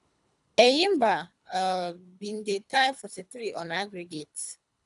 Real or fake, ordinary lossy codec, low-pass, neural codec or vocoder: fake; none; 10.8 kHz; codec, 24 kHz, 3 kbps, HILCodec